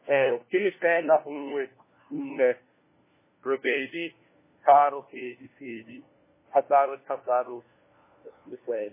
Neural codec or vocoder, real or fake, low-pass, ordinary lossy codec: codec, 16 kHz, 1 kbps, FunCodec, trained on LibriTTS, 50 frames a second; fake; 3.6 kHz; MP3, 16 kbps